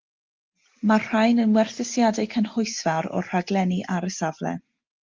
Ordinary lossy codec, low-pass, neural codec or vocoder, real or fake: Opus, 24 kbps; 7.2 kHz; none; real